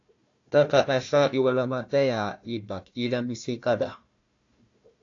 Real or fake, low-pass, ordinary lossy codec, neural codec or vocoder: fake; 7.2 kHz; AAC, 48 kbps; codec, 16 kHz, 1 kbps, FunCodec, trained on Chinese and English, 50 frames a second